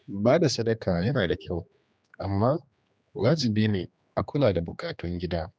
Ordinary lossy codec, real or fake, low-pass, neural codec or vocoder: none; fake; none; codec, 16 kHz, 2 kbps, X-Codec, HuBERT features, trained on general audio